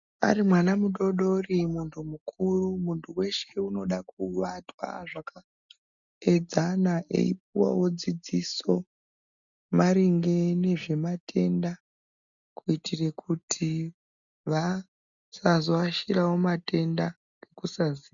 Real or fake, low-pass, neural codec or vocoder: real; 7.2 kHz; none